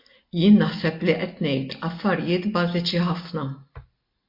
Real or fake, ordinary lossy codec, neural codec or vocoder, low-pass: real; MP3, 32 kbps; none; 5.4 kHz